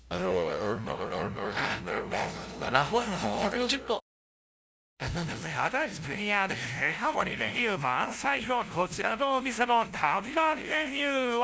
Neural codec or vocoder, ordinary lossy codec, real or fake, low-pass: codec, 16 kHz, 0.5 kbps, FunCodec, trained on LibriTTS, 25 frames a second; none; fake; none